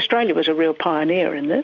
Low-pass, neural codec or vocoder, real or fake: 7.2 kHz; none; real